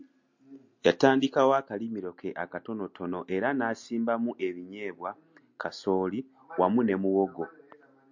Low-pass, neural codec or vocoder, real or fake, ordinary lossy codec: 7.2 kHz; none; real; MP3, 48 kbps